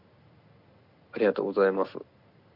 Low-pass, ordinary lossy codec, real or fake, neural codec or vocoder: 5.4 kHz; Opus, 64 kbps; real; none